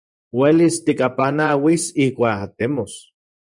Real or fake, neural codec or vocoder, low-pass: fake; vocoder, 24 kHz, 100 mel bands, Vocos; 10.8 kHz